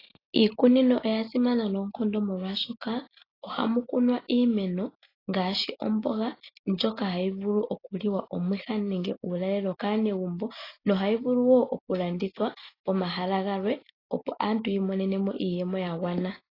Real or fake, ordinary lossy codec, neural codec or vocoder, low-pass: real; AAC, 24 kbps; none; 5.4 kHz